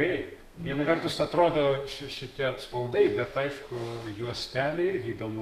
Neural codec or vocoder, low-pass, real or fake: codec, 32 kHz, 1.9 kbps, SNAC; 14.4 kHz; fake